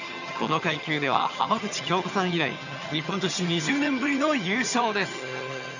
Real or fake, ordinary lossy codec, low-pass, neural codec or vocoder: fake; none; 7.2 kHz; vocoder, 22.05 kHz, 80 mel bands, HiFi-GAN